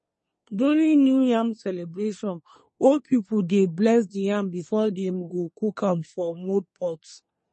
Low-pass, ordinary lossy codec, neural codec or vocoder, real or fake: 10.8 kHz; MP3, 32 kbps; codec, 24 kHz, 1 kbps, SNAC; fake